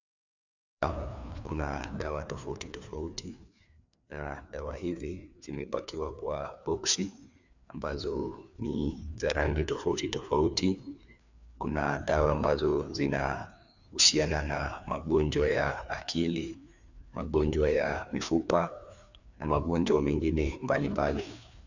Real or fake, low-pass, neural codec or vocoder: fake; 7.2 kHz; codec, 16 kHz, 2 kbps, FreqCodec, larger model